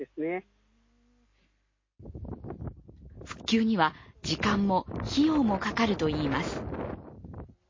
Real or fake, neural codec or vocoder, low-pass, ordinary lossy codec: real; none; 7.2 kHz; MP3, 32 kbps